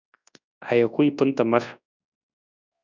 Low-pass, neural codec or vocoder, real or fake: 7.2 kHz; codec, 24 kHz, 0.9 kbps, WavTokenizer, large speech release; fake